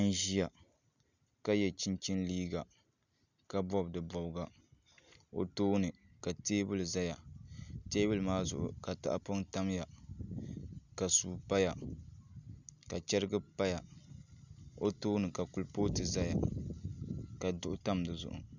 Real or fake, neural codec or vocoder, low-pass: real; none; 7.2 kHz